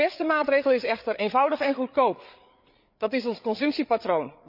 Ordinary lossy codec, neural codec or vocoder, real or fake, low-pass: none; codec, 16 kHz, 8 kbps, FreqCodec, larger model; fake; 5.4 kHz